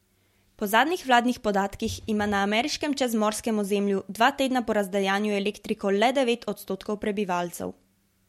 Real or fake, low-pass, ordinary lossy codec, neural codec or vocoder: real; 19.8 kHz; MP3, 64 kbps; none